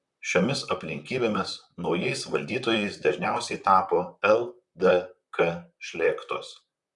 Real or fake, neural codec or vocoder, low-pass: fake; vocoder, 44.1 kHz, 128 mel bands, Pupu-Vocoder; 10.8 kHz